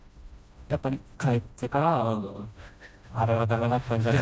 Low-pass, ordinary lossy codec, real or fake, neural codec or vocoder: none; none; fake; codec, 16 kHz, 1 kbps, FreqCodec, smaller model